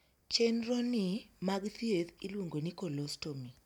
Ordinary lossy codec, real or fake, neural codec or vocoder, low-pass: none; real; none; 19.8 kHz